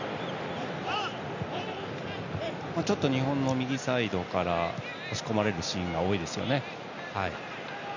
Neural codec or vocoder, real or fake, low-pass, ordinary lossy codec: none; real; 7.2 kHz; none